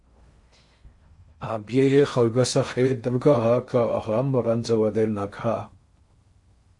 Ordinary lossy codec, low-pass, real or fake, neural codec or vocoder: MP3, 48 kbps; 10.8 kHz; fake; codec, 16 kHz in and 24 kHz out, 0.6 kbps, FocalCodec, streaming, 4096 codes